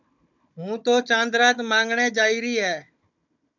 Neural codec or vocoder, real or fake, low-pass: codec, 16 kHz, 16 kbps, FunCodec, trained on Chinese and English, 50 frames a second; fake; 7.2 kHz